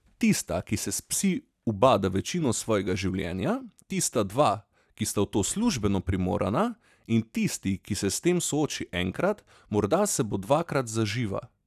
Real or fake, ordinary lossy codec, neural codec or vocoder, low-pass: real; none; none; 14.4 kHz